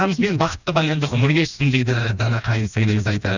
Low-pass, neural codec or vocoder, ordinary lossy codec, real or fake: 7.2 kHz; codec, 16 kHz, 1 kbps, FreqCodec, smaller model; none; fake